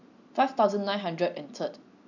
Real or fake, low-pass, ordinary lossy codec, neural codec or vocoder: real; 7.2 kHz; none; none